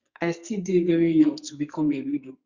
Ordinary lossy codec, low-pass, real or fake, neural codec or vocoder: Opus, 64 kbps; 7.2 kHz; fake; codec, 44.1 kHz, 2.6 kbps, SNAC